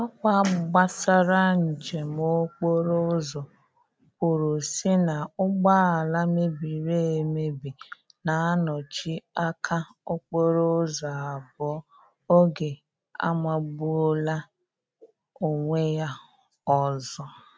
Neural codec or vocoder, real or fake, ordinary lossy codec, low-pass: none; real; none; none